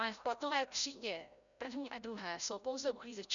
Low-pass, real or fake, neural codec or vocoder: 7.2 kHz; fake; codec, 16 kHz, 0.5 kbps, FreqCodec, larger model